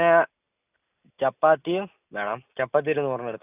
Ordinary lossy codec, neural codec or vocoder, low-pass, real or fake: none; none; 3.6 kHz; real